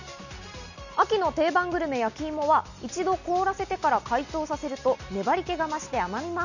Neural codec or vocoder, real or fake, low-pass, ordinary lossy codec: none; real; 7.2 kHz; none